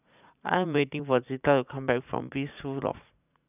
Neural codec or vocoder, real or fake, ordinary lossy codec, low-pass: vocoder, 44.1 kHz, 80 mel bands, Vocos; fake; none; 3.6 kHz